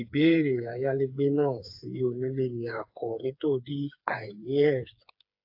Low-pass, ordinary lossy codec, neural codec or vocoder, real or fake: 5.4 kHz; AAC, 48 kbps; codec, 16 kHz, 4 kbps, FreqCodec, smaller model; fake